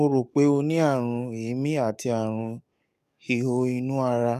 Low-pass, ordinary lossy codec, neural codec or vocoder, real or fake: 14.4 kHz; none; codec, 44.1 kHz, 7.8 kbps, DAC; fake